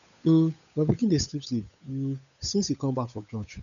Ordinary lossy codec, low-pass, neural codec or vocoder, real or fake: none; 7.2 kHz; codec, 16 kHz, 8 kbps, FunCodec, trained on Chinese and English, 25 frames a second; fake